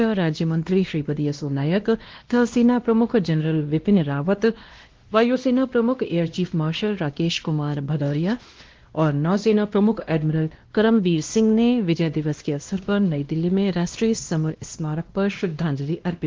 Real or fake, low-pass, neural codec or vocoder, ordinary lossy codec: fake; 7.2 kHz; codec, 16 kHz, 1 kbps, X-Codec, WavLM features, trained on Multilingual LibriSpeech; Opus, 16 kbps